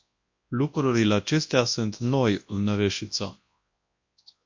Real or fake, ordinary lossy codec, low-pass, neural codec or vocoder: fake; MP3, 48 kbps; 7.2 kHz; codec, 24 kHz, 0.9 kbps, WavTokenizer, large speech release